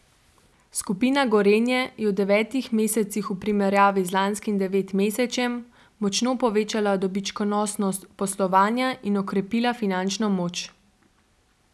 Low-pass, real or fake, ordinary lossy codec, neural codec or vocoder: none; real; none; none